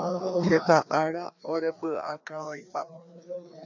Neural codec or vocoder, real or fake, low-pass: codec, 16 kHz, 2 kbps, FreqCodec, larger model; fake; 7.2 kHz